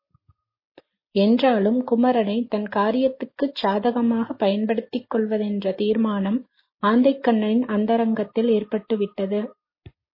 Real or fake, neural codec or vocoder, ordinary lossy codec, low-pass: real; none; MP3, 24 kbps; 5.4 kHz